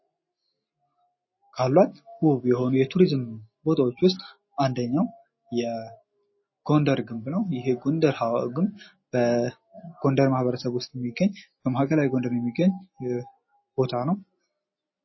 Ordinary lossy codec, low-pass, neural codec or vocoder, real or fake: MP3, 24 kbps; 7.2 kHz; none; real